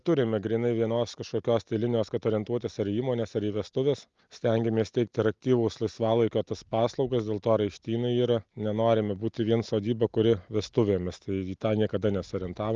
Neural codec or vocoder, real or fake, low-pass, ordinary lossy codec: none; real; 7.2 kHz; Opus, 32 kbps